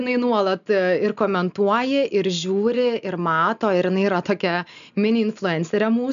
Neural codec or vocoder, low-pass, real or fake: none; 7.2 kHz; real